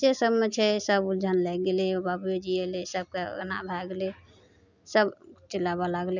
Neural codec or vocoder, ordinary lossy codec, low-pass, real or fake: none; none; 7.2 kHz; real